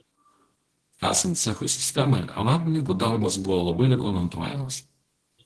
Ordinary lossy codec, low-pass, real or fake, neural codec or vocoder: Opus, 16 kbps; 10.8 kHz; fake; codec, 24 kHz, 0.9 kbps, WavTokenizer, medium music audio release